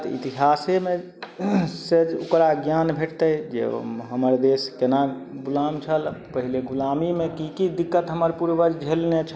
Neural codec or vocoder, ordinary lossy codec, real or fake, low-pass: none; none; real; none